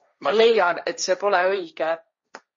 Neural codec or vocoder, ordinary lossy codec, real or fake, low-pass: codec, 16 kHz, 1.1 kbps, Voila-Tokenizer; MP3, 32 kbps; fake; 7.2 kHz